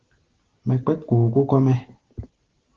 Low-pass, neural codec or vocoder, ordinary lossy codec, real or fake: 7.2 kHz; none; Opus, 16 kbps; real